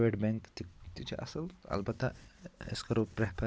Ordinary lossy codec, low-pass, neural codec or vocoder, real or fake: none; none; none; real